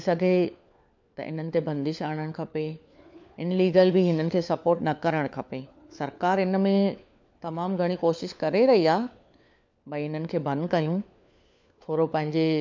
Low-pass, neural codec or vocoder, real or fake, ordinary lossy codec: 7.2 kHz; codec, 16 kHz, 4 kbps, FunCodec, trained on LibriTTS, 50 frames a second; fake; MP3, 64 kbps